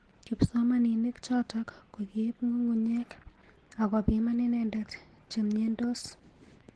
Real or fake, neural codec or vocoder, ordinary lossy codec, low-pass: real; none; Opus, 16 kbps; 10.8 kHz